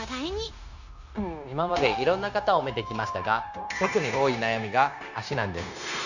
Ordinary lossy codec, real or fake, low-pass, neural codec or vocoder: none; fake; 7.2 kHz; codec, 16 kHz, 0.9 kbps, LongCat-Audio-Codec